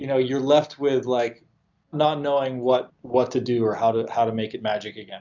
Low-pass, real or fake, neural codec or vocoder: 7.2 kHz; real; none